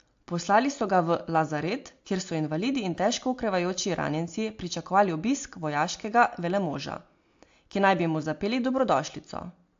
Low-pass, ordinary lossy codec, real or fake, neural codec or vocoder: 7.2 kHz; AAC, 48 kbps; real; none